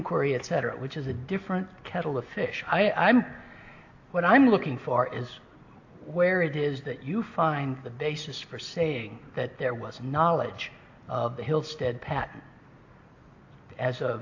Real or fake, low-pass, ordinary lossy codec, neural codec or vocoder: real; 7.2 kHz; AAC, 48 kbps; none